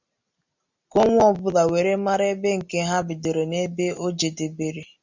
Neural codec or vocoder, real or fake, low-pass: none; real; 7.2 kHz